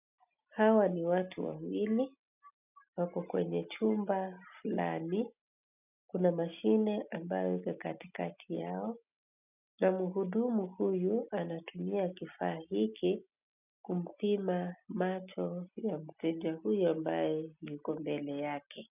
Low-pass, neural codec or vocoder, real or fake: 3.6 kHz; none; real